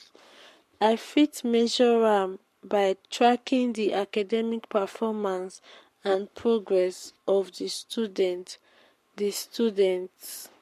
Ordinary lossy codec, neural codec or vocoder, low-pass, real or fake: MP3, 64 kbps; codec, 44.1 kHz, 7.8 kbps, Pupu-Codec; 14.4 kHz; fake